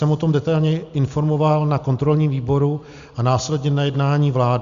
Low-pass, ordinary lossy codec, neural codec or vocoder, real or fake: 7.2 kHz; Opus, 64 kbps; none; real